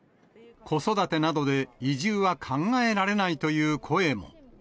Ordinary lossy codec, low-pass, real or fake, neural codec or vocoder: none; none; real; none